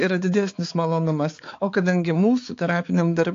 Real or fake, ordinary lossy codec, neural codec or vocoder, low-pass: fake; AAC, 48 kbps; codec, 16 kHz, 4 kbps, X-Codec, HuBERT features, trained on balanced general audio; 7.2 kHz